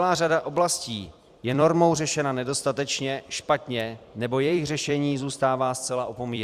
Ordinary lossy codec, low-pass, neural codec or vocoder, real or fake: Opus, 64 kbps; 14.4 kHz; vocoder, 44.1 kHz, 128 mel bands every 256 samples, BigVGAN v2; fake